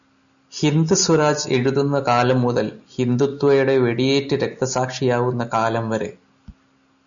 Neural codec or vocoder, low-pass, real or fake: none; 7.2 kHz; real